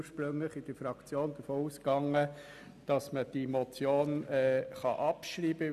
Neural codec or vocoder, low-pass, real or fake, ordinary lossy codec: none; 14.4 kHz; real; none